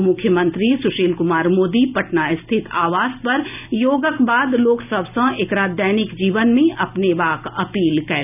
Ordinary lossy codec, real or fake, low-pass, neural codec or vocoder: none; real; 3.6 kHz; none